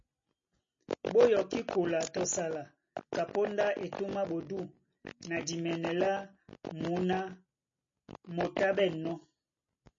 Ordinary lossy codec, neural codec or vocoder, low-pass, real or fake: MP3, 32 kbps; none; 7.2 kHz; real